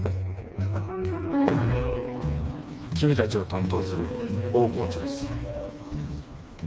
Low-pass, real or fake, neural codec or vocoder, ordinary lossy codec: none; fake; codec, 16 kHz, 2 kbps, FreqCodec, smaller model; none